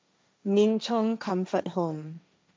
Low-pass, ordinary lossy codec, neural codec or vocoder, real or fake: none; none; codec, 16 kHz, 1.1 kbps, Voila-Tokenizer; fake